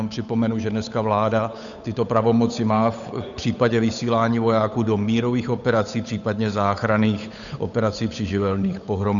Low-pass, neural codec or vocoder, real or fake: 7.2 kHz; codec, 16 kHz, 8 kbps, FunCodec, trained on Chinese and English, 25 frames a second; fake